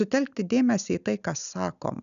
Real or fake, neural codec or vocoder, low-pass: real; none; 7.2 kHz